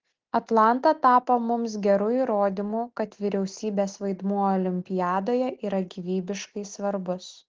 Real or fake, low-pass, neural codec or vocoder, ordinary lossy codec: real; 7.2 kHz; none; Opus, 16 kbps